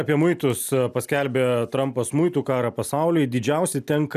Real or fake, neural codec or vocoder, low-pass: real; none; 14.4 kHz